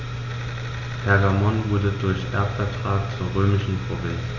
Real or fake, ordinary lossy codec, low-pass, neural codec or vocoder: real; none; 7.2 kHz; none